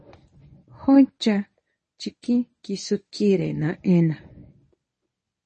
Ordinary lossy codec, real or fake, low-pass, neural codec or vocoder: MP3, 32 kbps; fake; 10.8 kHz; codec, 24 kHz, 0.9 kbps, WavTokenizer, medium speech release version 1